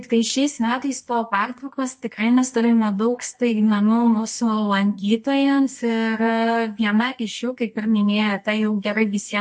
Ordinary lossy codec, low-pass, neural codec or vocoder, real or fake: MP3, 48 kbps; 10.8 kHz; codec, 24 kHz, 0.9 kbps, WavTokenizer, medium music audio release; fake